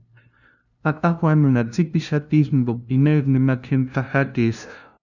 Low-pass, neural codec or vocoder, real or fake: 7.2 kHz; codec, 16 kHz, 0.5 kbps, FunCodec, trained on LibriTTS, 25 frames a second; fake